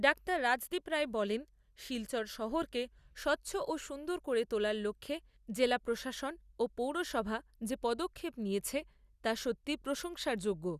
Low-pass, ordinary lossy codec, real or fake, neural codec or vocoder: 14.4 kHz; Opus, 64 kbps; real; none